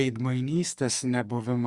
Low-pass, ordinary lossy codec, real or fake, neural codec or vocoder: 10.8 kHz; Opus, 64 kbps; fake; codec, 44.1 kHz, 2.6 kbps, SNAC